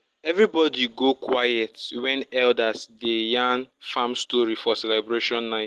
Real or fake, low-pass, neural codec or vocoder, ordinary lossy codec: real; 14.4 kHz; none; Opus, 16 kbps